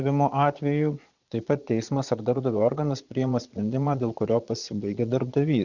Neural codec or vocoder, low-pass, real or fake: vocoder, 22.05 kHz, 80 mel bands, Vocos; 7.2 kHz; fake